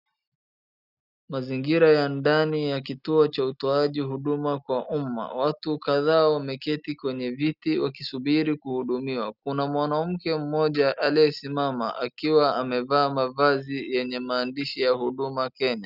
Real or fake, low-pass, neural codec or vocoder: real; 5.4 kHz; none